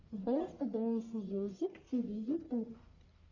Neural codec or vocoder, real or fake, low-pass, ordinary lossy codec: codec, 44.1 kHz, 1.7 kbps, Pupu-Codec; fake; 7.2 kHz; Opus, 64 kbps